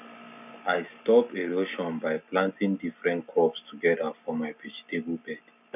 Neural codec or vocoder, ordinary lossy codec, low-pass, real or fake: none; none; 3.6 kHz; real